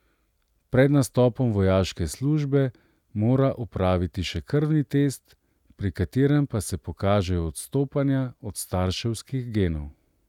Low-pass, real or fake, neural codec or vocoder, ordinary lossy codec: 19.8 kHz; real; none; none